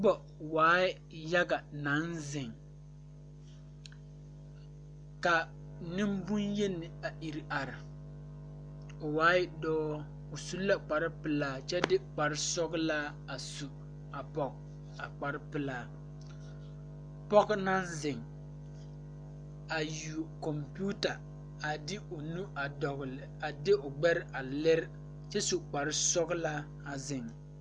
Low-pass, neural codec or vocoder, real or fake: 9.9 kHz; none; real